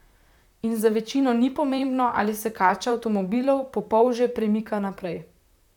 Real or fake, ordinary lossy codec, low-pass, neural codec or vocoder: fake; none; 19.8 kHz; vocoder, 44.1 kHz, 128 mel bands, Pupu-Vocoder